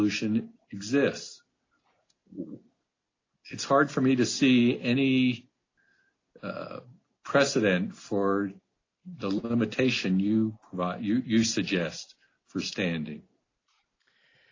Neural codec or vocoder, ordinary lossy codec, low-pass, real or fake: none; AAC, 32 kbps; 7.2 kHz; real